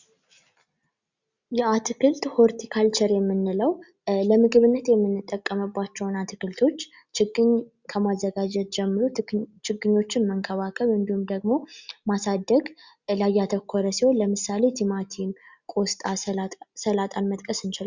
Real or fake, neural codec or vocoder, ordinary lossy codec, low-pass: real; none; Opus, 64 kbps; 7.2 kHz